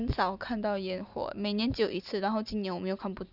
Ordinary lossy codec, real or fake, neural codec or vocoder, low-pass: none; real; none; 5.4 kHz